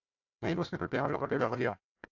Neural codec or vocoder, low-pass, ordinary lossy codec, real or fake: codec, 16 kHz, 1 kbps, FunCodec, trained on Chinese and English, 50 frames a second; 7.2 kHz; AAC, 48 kbps; fake